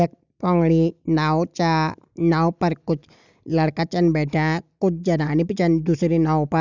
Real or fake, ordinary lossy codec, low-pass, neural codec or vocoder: real; none; 7.2 kHz; none